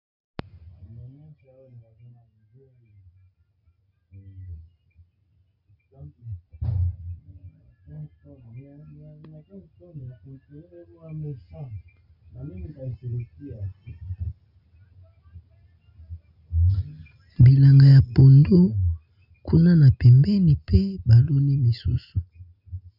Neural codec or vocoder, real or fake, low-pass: none; real; 5.4 kHz